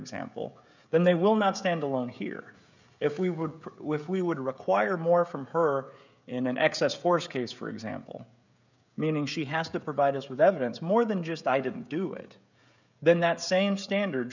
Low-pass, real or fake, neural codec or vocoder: 7.2 kHz; fake; codec, 16 kHz, 16 kbps, FreqCodec, smaller model